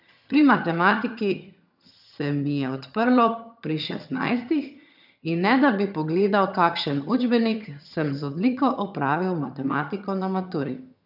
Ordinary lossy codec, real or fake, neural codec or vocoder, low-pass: none; fake; vocoder, 22.05 kHz, 80 mel bands, HiFi-GAN; 5.4 kHz